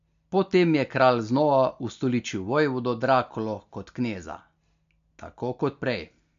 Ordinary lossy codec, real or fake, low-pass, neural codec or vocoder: MP3, 64 kbps; real; 7.2 kHz; none